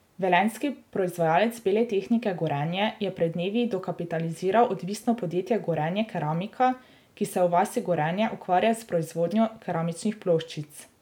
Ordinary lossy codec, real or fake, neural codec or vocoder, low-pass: none; real; none; 19.8 kHz